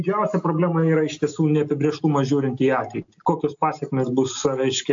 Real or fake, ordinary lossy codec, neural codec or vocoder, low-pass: real; AAC, 64 kbps; none; 7.2 kHz